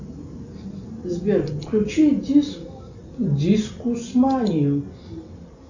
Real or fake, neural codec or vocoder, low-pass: real; none; 7.2 kHz